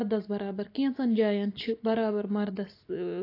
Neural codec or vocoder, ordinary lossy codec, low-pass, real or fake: none; AAC, 32 kbps; 5.4 kHz; real